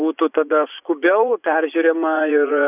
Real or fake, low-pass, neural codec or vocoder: fake; 3.6 kHz; vocoder, 24 kHz, 100 mel bands, Vocos